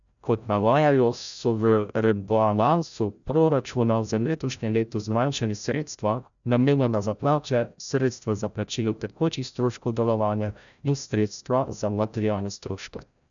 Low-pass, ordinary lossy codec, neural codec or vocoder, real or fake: 7.2 kHz; none; codec, 16 kHz, 0.5 kbps, FreqCodec, larger model; fake